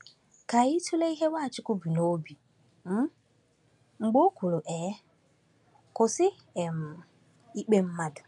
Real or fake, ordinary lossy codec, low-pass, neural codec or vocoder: real; none; 10.8 kHz; none